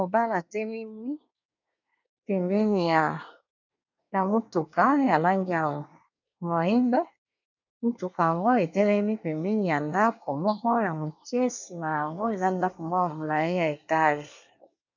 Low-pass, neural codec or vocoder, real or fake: 7.2 kHz; codec, 24 kHz, 1 kbps, SNAC; fake